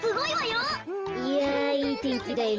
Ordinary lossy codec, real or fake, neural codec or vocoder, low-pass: Opus, 24 kbps; real; none; 7.2 kHz